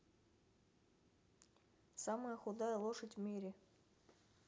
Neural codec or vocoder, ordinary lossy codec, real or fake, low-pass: none; none; real; none